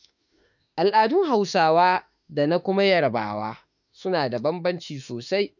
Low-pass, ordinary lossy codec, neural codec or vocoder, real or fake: 7.2 kHz; none; autoencoder, 48 kHz, 32 numbers a frame, DAC-VAE, trained on Japanese speech; fake